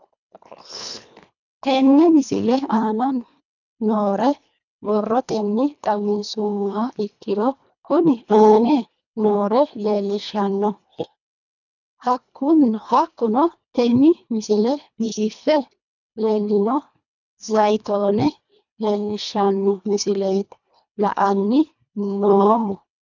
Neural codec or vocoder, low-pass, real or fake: codec, 24 kHz, 1.5 kbps, HILCodec; 7.2 kHz; fake